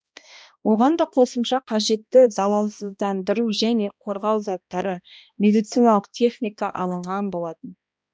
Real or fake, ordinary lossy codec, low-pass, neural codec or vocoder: fake; none; none; codec, 16 kHz, 1 kbps, X-Codec, HuBERT features, trained on balanced general audio